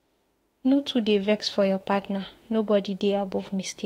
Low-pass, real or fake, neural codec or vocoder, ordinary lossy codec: 19.8 kHz; fake; autoencoder, 48 kHz, 32 numbers a frame, DAC-VAE, trained on Japanese speech; AAC, 48 kbps